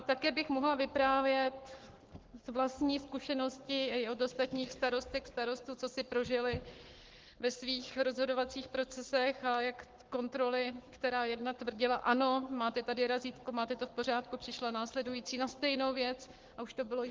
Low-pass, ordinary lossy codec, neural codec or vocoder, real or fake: 7.2 kHz; Opus, 16 kbps; codec, 44.1 kHz, 7.8 kbps, Pupu-Codec; fake